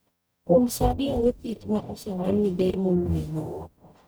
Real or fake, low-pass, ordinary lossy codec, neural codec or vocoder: fake; none; none; codec, 44.1 kHz, 0.9 kbps, DAC